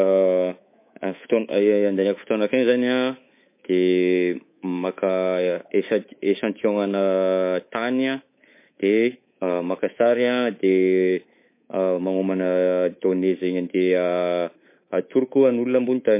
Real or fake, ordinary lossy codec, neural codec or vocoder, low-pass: fake; MP3, 24 kbps; codec, 24 kHz, 3.1 kbps, DualCodec; 3.6 kHz